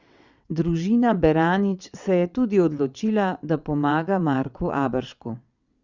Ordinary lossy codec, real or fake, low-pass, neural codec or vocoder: Opus, 64 kbps; fake; 7.2 kHz; vocoder, 22.05 kHz, 80 mel bands, WaveNeXt